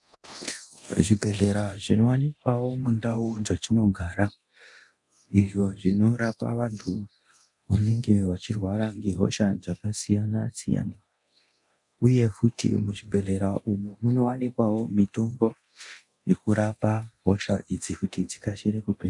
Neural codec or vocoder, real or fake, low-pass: codec, 24 kHz, 0.9 kbps, DualCodec; fake; 10.8 kHz